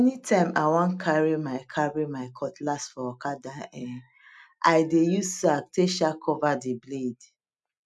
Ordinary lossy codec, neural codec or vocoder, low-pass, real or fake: none; none; none; real